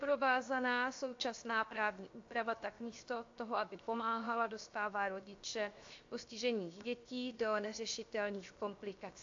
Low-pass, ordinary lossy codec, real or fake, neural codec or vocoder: 7.2 kHz; AAC, 48 kbps; fake; codec, 16 kHz, 0.7 kbps, FocalCodec